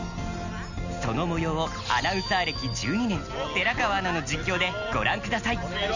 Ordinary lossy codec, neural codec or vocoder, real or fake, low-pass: none; none; real; 7.2 kHz